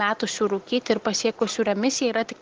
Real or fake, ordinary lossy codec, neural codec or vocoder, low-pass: real; Opus, 16 kbps; none; 7.2 kHz